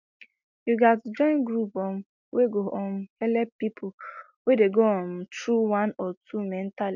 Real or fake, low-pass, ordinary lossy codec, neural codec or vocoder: real; 7.2 kHz; MP3, 64 kbps; none